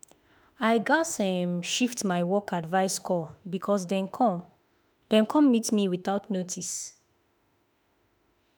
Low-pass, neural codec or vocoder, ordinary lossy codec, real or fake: none; autoencoder, 48 kHz, 32 numbers a frame, DAC-VAE, trained on Japanese speech; none; fake